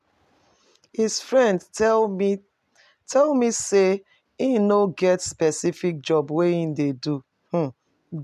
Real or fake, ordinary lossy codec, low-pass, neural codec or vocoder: real; MP3, 96 kbps; 14.4 kHz; none